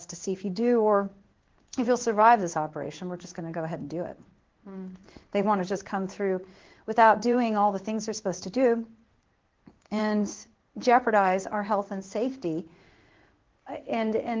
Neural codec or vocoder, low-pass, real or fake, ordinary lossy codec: codec, 16 kHz in and 24 kHz out, 1 kbps, XY-Tokenizer; 7.2 kHz; fake; Opus, 16 kbps